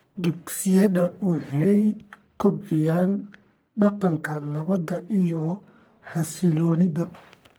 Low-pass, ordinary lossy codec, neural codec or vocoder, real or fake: none; none; codec, 44.1 kHz, 1.7 kbps, Pupu-Codec; fake